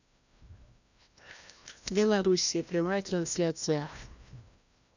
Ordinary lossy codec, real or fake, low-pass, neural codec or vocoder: none; fake; 7.2 kHz; codec, 16 kHz, 1 kbps, FreqCodec, larger model